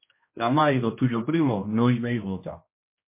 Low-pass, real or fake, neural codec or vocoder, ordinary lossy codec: 3.6 kHz; fake; codec, 16 kHz, 1 kbps, X-Codec, HuBERT features, trained on general audio; MP3, 24 kbps